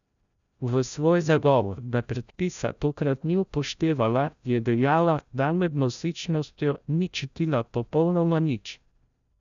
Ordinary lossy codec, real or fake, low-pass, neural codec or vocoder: MP3, 96 kbps; fake; 7.2 kHz; codec, 16 kHz, 0.5 kbps, FreqCodec, larger model